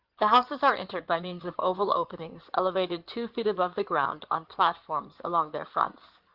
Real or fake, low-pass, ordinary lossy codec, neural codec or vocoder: fake; 5.4 kHz; Opus, 32 kbps; codec, 16 kHz in and 24 kHz out, 2.2 kbps, FireRedTTS-2 codec